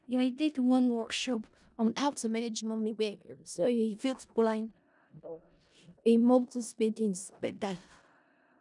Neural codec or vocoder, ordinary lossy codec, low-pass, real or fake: codec, 16 kHz in and 24 kHz out, 0.4 kbps, LongCat-Audio-Codec, four codebook decoder; none; 10.8 kHz; fake